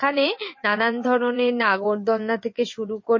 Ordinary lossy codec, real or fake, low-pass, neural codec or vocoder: MP3, 32 kbps; fake; 7.2 kHz; vocoder, 22.05 kHz, 80 mel bands, WaveNeXt